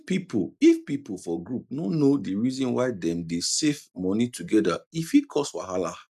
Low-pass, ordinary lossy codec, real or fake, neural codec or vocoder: 14.4 kHz; none; real; none